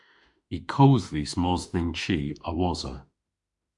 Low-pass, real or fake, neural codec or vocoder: 10.8 kHz; fake; autoencoder, 48 kHz, 32 numbers a frame, DAC-VAE, trained on Japanese speech